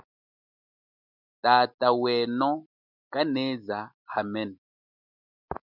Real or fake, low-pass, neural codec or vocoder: real; 5.4 kHz; none